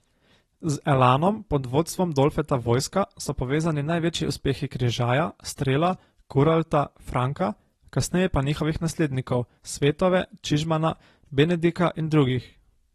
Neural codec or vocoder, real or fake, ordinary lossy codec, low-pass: none; real; AAC, 32 kbps; 19.8 kHz